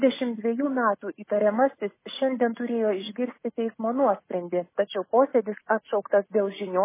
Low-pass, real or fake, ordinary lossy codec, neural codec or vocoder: 3.6 kHz; real; MP3, 16 kbps; none